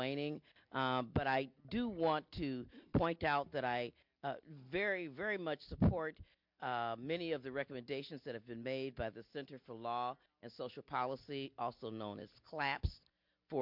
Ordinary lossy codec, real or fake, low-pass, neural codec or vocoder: MP3, 48 kbps; real; 5.4 kHz; none